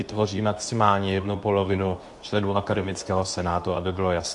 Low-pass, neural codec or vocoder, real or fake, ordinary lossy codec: 10.8 kHz; codec, 24 kHz, 0.9 kbps, WavTokenizer, medium speech release version 2; fake; AAC, 64 kbps